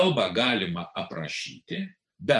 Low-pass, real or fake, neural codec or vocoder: 10.8 kHz; fake; vocoder, 24 kHz, 100 mel bands, Vocos